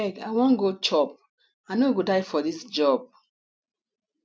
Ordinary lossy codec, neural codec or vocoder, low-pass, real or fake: none; none; none; real